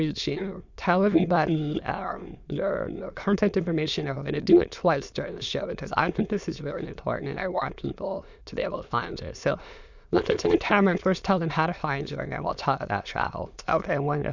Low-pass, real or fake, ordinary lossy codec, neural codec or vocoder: 7.2 kHz; fake; Opus, 64 kbps; autoencoder, 22.05 kHz, a latent of 192 numbers a frame, VITS, trained on many speakers